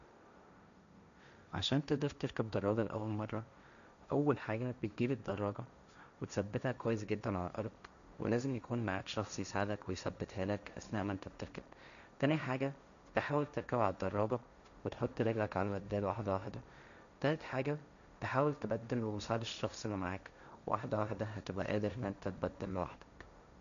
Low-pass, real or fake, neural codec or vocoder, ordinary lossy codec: 7.2 kHz; fake; codec, 16 kHz, 1.1 kbps, Voila-Tokenizer; none